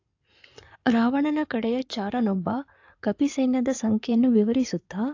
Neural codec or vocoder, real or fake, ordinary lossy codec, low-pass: codec, 16 kHz in and 24 kHz out, 2.2 kbps, FireRedTTS-2 codec; fake; AAC, 48 kbps; 7.2 kHz